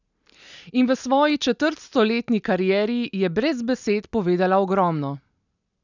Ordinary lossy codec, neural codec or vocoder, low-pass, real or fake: none; none; 7.2 kHz; real